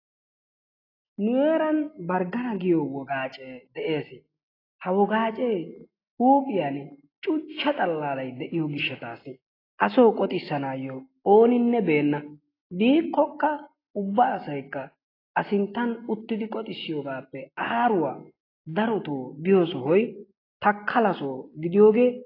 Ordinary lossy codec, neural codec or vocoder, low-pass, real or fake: AAC, 24 kbps; none; 5.4 kHz; real